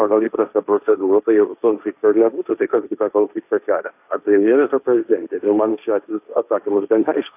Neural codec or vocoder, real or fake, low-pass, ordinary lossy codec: codec, 16 kHz, 1.1 kbps, Voila-Tokenizer; fake; 3.6 kHz; AAC, 32 kbps